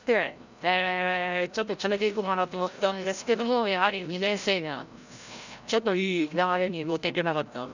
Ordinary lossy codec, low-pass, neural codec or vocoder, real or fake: none; 7.2 kHz; codec, 16 kHz, 0.5 kbps, FreqCodec, larger model; fake